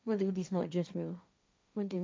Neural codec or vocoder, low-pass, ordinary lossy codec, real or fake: codec, 16 kHz, 1.1 kbps, Voila-Tokenizer; none; none; fake